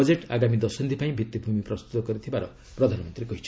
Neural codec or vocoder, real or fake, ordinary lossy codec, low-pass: none; real; none; none